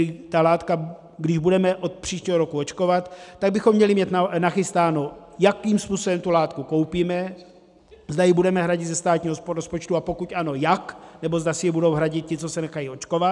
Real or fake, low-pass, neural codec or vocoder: real; 10.8 kHz; none